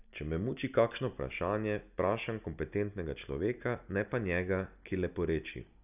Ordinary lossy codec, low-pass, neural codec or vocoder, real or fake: none; 3.6 kHz; none; real